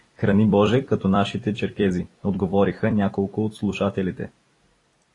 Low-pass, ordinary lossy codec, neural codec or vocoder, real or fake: 10.8 kHz; AAC, 32 kbps; vocoder, 44.1 kHz, 128 mel bands every 256 samples, BigVGAN v2; fake